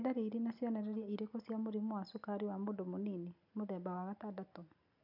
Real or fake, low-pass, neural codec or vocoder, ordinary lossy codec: real; 5.4 kHz; none; none